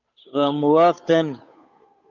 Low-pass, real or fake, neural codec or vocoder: 7.2 kHz; fake; codec, 16 kHz, 8 kbps, FunCodec, trained on Chinese and English, 25 frames a second